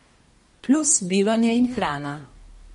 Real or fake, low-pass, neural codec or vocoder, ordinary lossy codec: fake; 10.8 kHz; codec, 24 kHz, 1 kbps, SNAC; MP3, 48 kbps